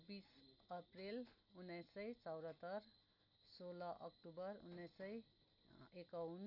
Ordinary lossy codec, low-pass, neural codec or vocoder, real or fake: none; 5.4 kHz; none; real